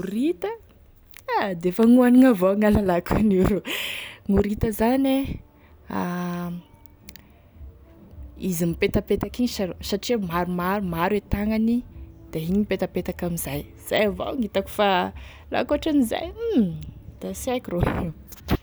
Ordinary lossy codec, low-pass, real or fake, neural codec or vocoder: none; none; real; none